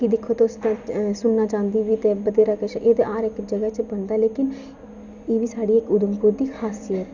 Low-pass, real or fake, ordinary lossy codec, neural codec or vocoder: 7.2 kHz; real; none; none